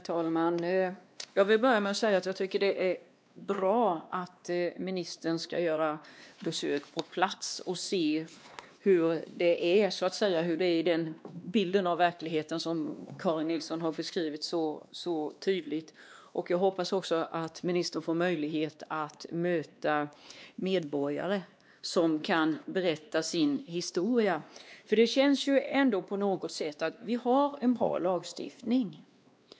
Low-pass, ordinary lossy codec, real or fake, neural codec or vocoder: none; none; fake; codec, 16 kHz, 2 kbps, X-Codec, WavLM features, trained on Multilingual LibriSpeech